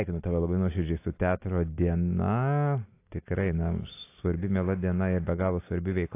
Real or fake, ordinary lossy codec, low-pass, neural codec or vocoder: real; AAC, 24 kbps; 3.6 kHz; none